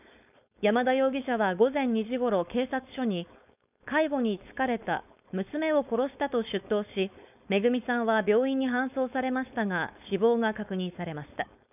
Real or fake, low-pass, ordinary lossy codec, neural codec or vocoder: fake; 3.6 kHz; none; codec, 16 kHz, 4.8 kbps, FACodec